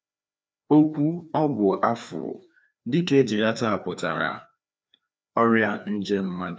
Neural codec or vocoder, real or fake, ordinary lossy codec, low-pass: codec, 16 kHz, 2 kbps, FreqCodec, larger model; fake; none; none